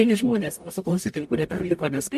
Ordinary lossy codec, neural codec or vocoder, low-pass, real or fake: MP3, 64 kbps; codec, 44.1 kHz, 0.9 kbps, DAC; 14.4 kHz; fake